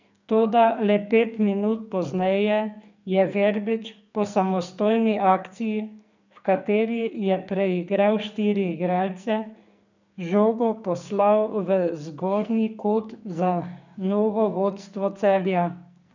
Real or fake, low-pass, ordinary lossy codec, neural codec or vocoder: fake; 7.2 kHz; none; codec, 44.1 kHz, 2.6 kbps, SNAC